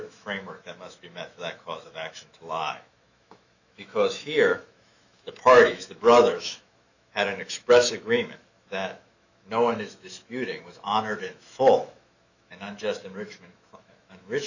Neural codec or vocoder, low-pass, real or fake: autoencoder, 48 kHz, 128 numbers a frame, DAC-VAE, trained on Japanese speech; 7.2 kHz; fake